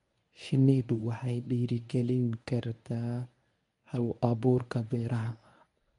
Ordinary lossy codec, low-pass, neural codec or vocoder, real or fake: MP3, 64 kbps; 10.8 kHz; codec, 24 kHz, 0.9 kbps, WavTokenizer, medium speech release version 1; fake